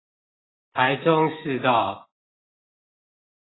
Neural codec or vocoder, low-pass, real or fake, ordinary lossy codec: none; 7.2 kHz; real; AAC, 16 kbps